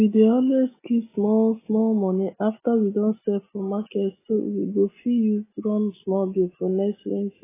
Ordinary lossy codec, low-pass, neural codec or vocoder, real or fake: AAC, 16 kbps; 3.6 kHz; none; real